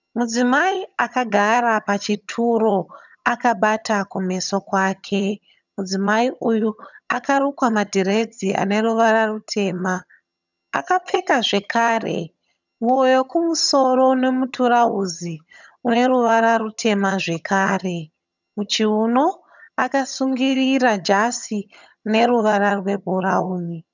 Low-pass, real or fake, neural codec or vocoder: 7.2 kHz; fake; vocoder, 22.05 kHz, 80 mel bands, HiFi-GAN